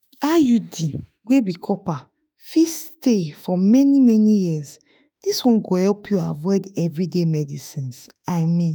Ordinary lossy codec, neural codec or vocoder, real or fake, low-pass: none; autoencoder, 48 kHz, 32 numbers a frame, DAC-VAE, trained on Japanese speech; fake; none